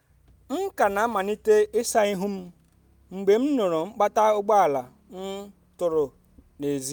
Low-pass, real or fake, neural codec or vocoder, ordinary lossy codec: none; real; none; none